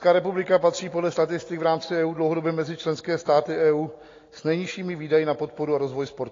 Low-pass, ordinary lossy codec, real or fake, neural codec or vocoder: 7.2 kHz; AAC, 32 kbps; real; none